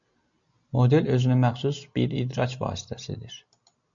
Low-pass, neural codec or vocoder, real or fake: 7.2 kHz; none; real